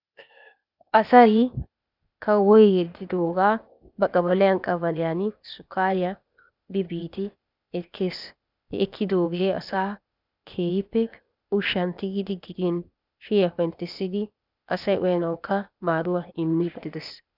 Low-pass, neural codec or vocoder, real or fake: 5.4 kHz; codec, 16 kHz, 0.8 kbps, ZipCodec; fake